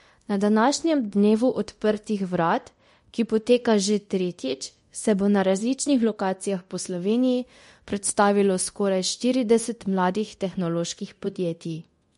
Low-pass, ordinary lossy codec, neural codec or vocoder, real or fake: 10.8 kHz; MP3, 48 kbps; codec, 24 kHz, 0.9 kbps, DualCodec; fake